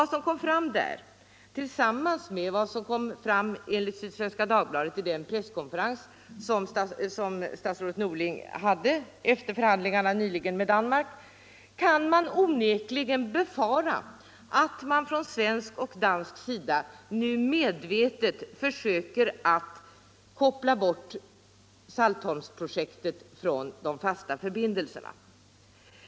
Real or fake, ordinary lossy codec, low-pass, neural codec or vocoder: real; none; none; none